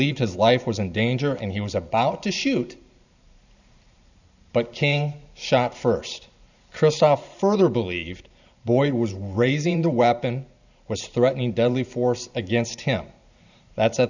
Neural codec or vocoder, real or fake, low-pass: vocoder, 44.1 kHz, 128 mel bands every 512 samples, BigVGAN v2; fake; 7.2 kHz